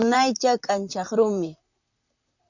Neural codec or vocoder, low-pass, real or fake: vocoder, 44.1 kHz, 128 mel bands, Pupu-Vocoder; 7.2 kHz; fake